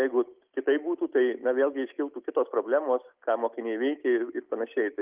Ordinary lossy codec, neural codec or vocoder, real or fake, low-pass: Opus, 24 kbps; none; real; 3.6 kHz